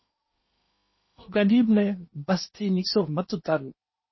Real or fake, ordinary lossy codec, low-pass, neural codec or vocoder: fake; MP3, 24 kbps; 7.2 kHz; codec, 16 kHz in and 24 kHz out, 0.8 kbps, FocalCodec, streaming, 65536 codes